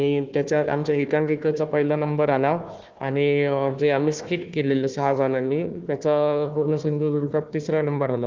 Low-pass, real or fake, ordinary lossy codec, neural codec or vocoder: 7.2 kHz; fake; Opus, 32 kbps; codec, 16 kHz, 1 kbps, FunCodec, trained on Chinese and English, 50 frames a second